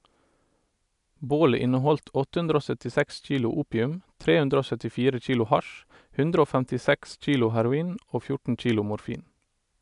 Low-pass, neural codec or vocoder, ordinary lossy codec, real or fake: 10.8 kHz; none; MP3, 96 kbps; real